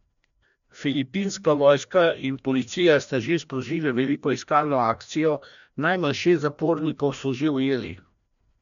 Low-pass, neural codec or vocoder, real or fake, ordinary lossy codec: 7.2 kHz; codec, 16 kHz, 1 kbps, FreqCodec, larger model; fake; none